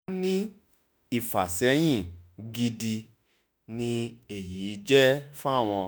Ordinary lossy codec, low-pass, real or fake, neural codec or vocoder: none; none; fake; autoencoder, 48 kHz, 32 numbers a frame, DAC-VAE, trained on Japanese speech